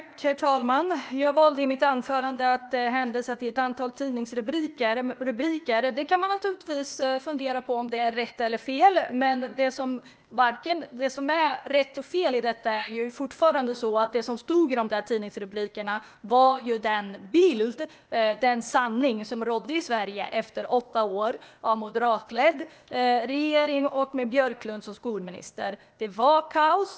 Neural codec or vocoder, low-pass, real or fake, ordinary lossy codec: codec, 16 kHz, 0.8 kbps, ZipCodec; none; fake; none